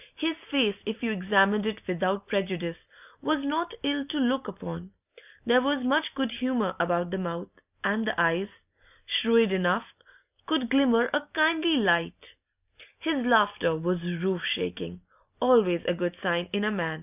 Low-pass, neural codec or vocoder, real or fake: 3.6 kHz; none; real